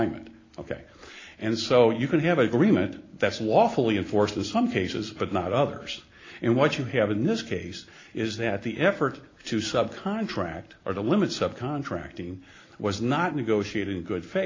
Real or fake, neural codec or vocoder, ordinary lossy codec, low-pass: real; none; AAC, 32 kbps; 7.2 kHz